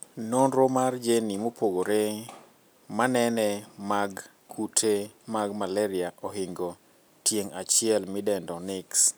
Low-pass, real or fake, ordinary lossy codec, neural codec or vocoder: none; real; none; none